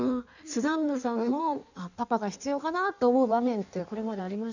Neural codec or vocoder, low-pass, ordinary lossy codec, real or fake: codec, 16 kHz in and 24 kHz out, 1.1 kbps, FireRedTTS-2 codec; 7.2 kHz; none; fake